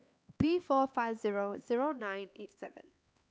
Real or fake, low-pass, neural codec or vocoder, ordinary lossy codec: fake; none; codec, 16 kHz, 4 kbps, X-Codec, HuBERT features, trained on LibriSpeech; none